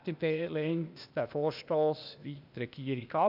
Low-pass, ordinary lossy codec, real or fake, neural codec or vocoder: 5.4 kHz; none; fake; codec, 16 kHz, 0.8 kbps, ZipCodec